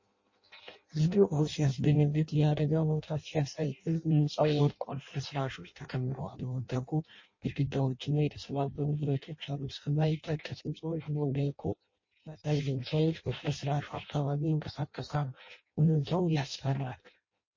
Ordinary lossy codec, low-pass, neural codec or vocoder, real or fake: MP3, 32 kbps; 7.2 kHz; codec, 16 kHz in and 24 kHz out, 0.6 kbps, FireRedTTS-2 codec; fake